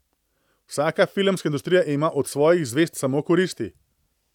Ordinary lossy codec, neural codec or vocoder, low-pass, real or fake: none; none; 19.8 kHz; real